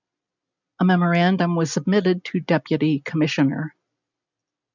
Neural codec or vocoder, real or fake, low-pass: none; real; 7.2 kHz